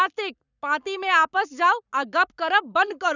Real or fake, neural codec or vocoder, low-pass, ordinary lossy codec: fake; codec, 44.1 kHz, 7.8 kbps, Pupu-Codec; 7.2 kHz; none